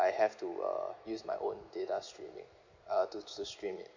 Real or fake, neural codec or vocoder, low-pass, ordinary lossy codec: real; none; 7.2 kHz; MP3, 48 kbps